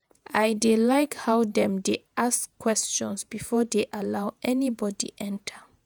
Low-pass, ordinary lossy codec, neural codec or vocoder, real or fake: none; none; vocoder, 48 kHz, 128 mel bands, Vocos; fake